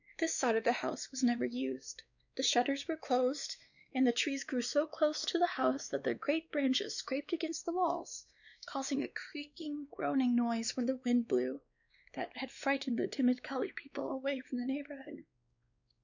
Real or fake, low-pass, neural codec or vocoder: fake; 7.2 kHz; codec, 16 kHz, 2 kbps, X-Codec, WavLM features, trained on Multilingual LibriSpeech